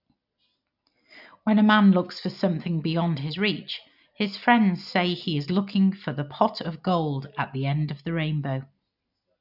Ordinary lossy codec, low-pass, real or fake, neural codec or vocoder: none; 5.4 kHz; real; none